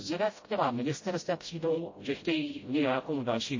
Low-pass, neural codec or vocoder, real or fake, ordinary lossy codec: 7.2 kHz; codec, 16 kHz, 0.5 kbps, FreqCodec, smaller model; fake; MP3, 32 kbps